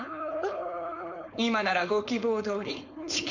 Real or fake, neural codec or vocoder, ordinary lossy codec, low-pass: fake; codec, 16 kHz, 4.8 kbps, FACodec; Opus, 64 kbps; 7.2 kHz